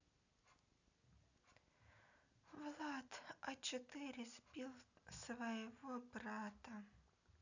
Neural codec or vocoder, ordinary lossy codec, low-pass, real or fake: none; none; 7.2 kHz; real